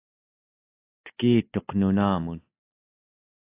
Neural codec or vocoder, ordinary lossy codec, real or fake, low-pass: none; AAC, 24 kbps; real; 3.6 kHz